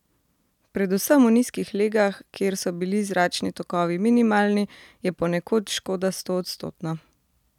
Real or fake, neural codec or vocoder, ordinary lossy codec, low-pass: real; none; none; 19.8 kHz